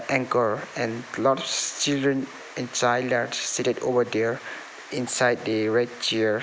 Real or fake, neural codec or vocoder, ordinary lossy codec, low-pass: real; none; none; none